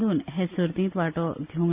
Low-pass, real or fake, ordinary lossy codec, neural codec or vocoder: 3.6 kHz; real; Opus, 64 kbps; none